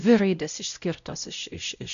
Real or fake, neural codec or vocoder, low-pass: fake; codec, 16 kHz, 0.5 kbps, X-Codec, WavLM features, trained on Multilingual LibriSpeech; 7.2 kHz